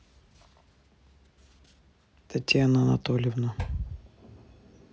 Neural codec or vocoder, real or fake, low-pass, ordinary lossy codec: none; real; none; none